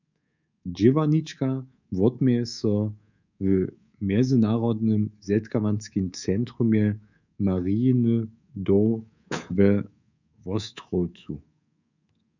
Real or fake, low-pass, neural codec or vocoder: fake; 7.2 kHz; codec, 24 kHz, 3.1 kbps, DualCodec